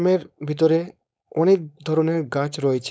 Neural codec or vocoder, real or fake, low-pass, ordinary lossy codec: codec, 16 kHz, 4.8 kbps, FACodec; fake; none; none